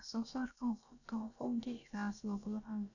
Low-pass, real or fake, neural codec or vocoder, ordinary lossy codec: 7.2 kHz; fake; codec, 16 kHz, about 1 kbps, DyCAST, with the encoder's durations; none